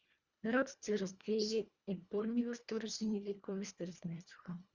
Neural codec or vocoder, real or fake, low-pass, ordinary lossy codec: codec, 24 kHz, 1.5 kbps, HILCodec; fake; 7.2 kHz; Opus, 64 kbps